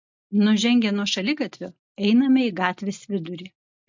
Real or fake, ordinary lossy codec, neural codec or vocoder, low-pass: real; MP3, 64 kbps; none; 7.2 kHz